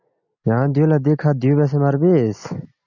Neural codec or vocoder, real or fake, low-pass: none; real; 7.2 kHz